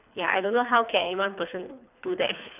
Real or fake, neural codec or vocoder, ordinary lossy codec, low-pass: fake; codec, 24 kHz, 3 kbps, HILCodec; none; 3.6 kHz